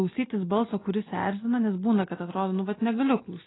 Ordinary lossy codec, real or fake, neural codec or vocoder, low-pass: AAC, 16 kbps; real; none; 7.2 kHz